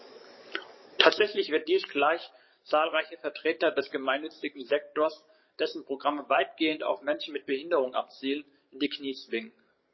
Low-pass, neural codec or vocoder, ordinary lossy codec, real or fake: 7.2 kHz; codec, 44.1 kHz, 7.8 kbps, DAC; MP3, 24 kbps; fake